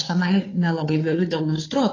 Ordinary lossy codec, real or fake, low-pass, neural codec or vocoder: AAC, 32 kbps; fake; 7.2 kHz; codec, 16 kHz, 2 kbps, FunCodec, trained on Chinese and English, 25 frames a second